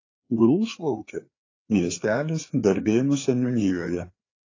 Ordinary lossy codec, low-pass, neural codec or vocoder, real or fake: AAC, 32 kbps; 7.2 kHz; codec, 16 kHz, 4 kbps, FreqCodec, larger model; fake